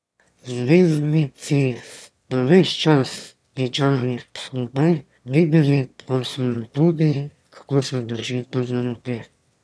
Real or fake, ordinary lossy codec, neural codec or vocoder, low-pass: fake; none; autoencoder, 22.05 kHz, a latent of 192 numbers a frame, VITS, trained on one speaker; none